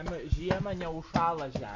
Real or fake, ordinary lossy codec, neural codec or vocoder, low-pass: real; MP3, 48 kbps; none; 7.2 kHz